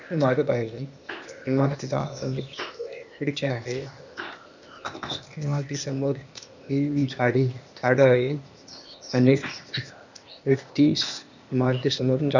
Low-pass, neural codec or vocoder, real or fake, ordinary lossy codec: 7.2 kHz; codec, 16 kHz, 0.8 kbps, ZipCodec; fake; none